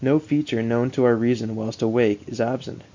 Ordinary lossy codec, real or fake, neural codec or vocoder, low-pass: MP3, 48 kbps; real; none; 7.2 kHz